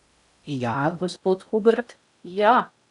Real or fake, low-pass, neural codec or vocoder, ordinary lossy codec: fake; 10.8 kHz; codec, 16 kHz in and 24 kHz out, 0.6 kbps, FocalCodec, streaming, 4096 codes; none